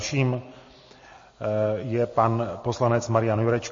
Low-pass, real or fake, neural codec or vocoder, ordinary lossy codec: 7.2 kHz; real; none; MP3, 32 kbps